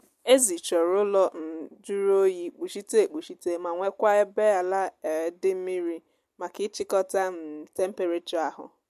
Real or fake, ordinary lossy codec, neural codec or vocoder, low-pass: real; MP3, 64 kbps; none; 14.4 kHz